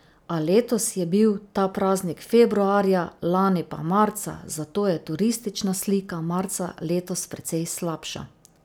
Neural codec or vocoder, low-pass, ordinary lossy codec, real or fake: none; none; none; real